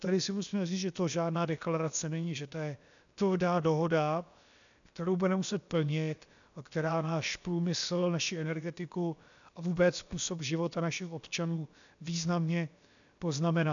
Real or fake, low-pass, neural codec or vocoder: fake; 7.2 kHz; codec, 16 kHz, about 1 kbps, DyCAST, with the encoder's durations